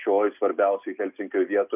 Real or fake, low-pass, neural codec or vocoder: real; 3.6 kHz; none